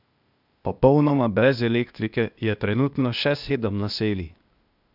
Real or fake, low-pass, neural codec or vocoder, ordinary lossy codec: fake; 5.4 kHz; codec, 16 kHz, 0.8 kbps, ZipCodec; none